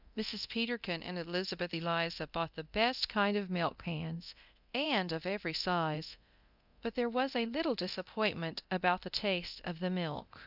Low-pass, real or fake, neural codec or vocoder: 5.4 kHz; fake; codec, 24 kHz, 0.9 kbps, DualCodec